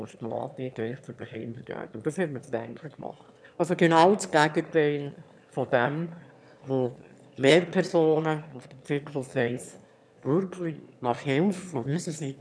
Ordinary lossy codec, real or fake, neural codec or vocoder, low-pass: none; fake; autoencoder, 22.05 kHz, a latent of 192 numbers a frame, VITS, trained on one speaker; none